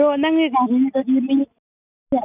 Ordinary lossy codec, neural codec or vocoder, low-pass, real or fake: none; none; 3.6 kHz; real